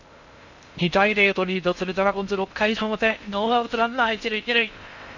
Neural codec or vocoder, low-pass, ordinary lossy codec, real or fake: codec, 16 kHz in and 24 kHz out, 0.6 kbps, FocalCodec, streaming, 2048 codes; 7.2 kHz; none; fake